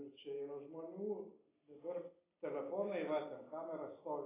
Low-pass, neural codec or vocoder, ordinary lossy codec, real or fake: 3.6 kHz; none; AAC, 16 kbps; real